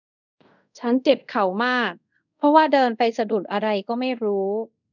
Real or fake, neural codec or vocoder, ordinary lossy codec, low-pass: fake; codec, 24 kHz, 0.5 kbps, DualCodec; none; 7.2 kHz